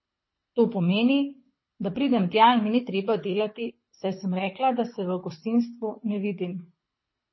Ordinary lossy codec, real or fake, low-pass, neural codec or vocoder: MP3, 24 kbps; fake; 7.2 kHz; codec, 24 kHz, 6 kbps, HILCodec